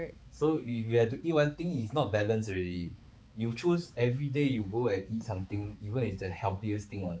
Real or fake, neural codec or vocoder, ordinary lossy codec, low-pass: fake; codec, 16 kHz, 4 kbps, X-Codec, HuBERT features, trained on balanced general audio; none; none